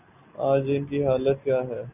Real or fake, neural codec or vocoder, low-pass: real; none; 3.6 kHz